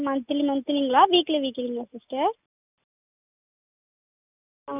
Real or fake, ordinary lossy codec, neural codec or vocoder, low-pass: real; none; none; 3.6 kHz